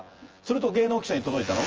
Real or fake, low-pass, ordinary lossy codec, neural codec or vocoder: fake; 7.2 kHz; Opus, 24 kbps; vocoder, 24 kHz, 100 mel bands, Vocos